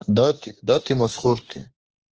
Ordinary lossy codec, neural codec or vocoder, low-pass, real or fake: Opus, 16 kbps; autoencoder, 48 kHz, 32 numbers a frame, DAC-VAE, trained on Japanese speech; 7.2 kHz; fake